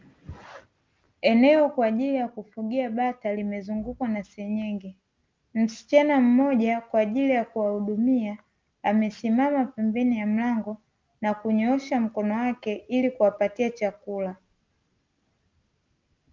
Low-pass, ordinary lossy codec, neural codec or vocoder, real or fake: 7.2 kHz; Opus, 24 kbps; none; real